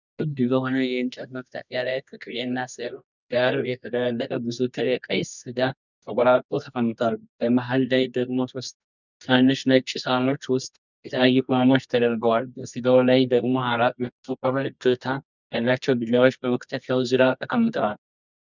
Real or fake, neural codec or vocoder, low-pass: fake; codec, 24 kHz, 0.9 kbps, WavTokenizer, medium music audio release; 7.2 kHz